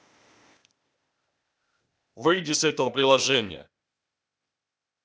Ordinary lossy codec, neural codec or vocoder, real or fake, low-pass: none; codec, 16 kHz, 0.8 kbps, ZipCodec; fake; none